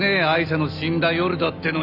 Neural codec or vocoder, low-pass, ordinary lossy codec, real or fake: none; 5.4 kHz; none; real